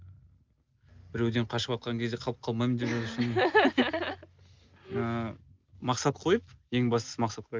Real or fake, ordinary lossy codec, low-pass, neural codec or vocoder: real; Opus, 24 kbps; 7.2 kHz; none